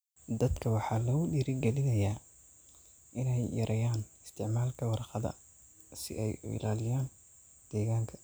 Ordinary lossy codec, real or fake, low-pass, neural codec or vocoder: none; real; none; none